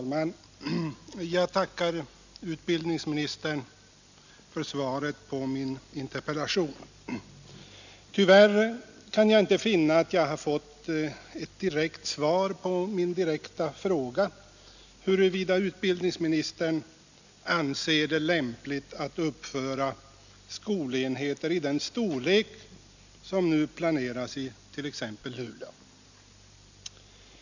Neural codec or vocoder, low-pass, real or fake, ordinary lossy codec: none; 7.2 kHz; real; none